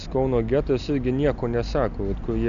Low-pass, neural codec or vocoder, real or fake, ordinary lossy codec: 7.2 kHz; none; real; MP3, 96 kbps